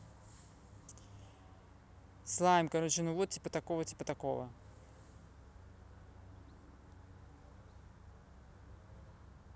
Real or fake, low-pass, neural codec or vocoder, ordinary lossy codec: real; none; none; none